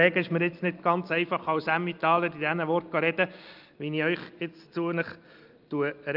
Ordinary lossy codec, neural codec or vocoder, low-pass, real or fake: Opus, 24 kbps; none; 5.4 kHz; real